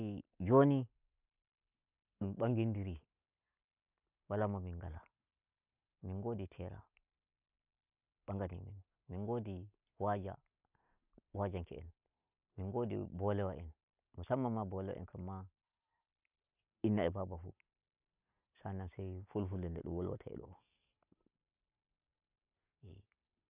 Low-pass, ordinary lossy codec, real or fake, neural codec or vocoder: 3.6 kHz; none; real; none